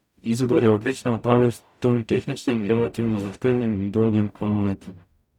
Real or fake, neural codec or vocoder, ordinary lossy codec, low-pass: fake; codec, 44.1 kHz, 0.9 kbps, DAC; none; 19.8 kHz